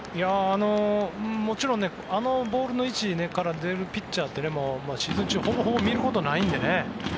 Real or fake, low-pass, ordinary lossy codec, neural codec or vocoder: real; none; none; none